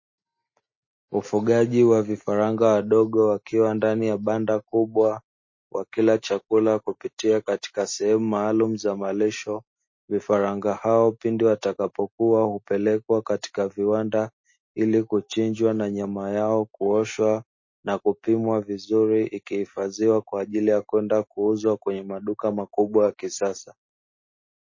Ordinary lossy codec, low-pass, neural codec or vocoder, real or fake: MP3, 32 kbps; 7.2 kHz; none; real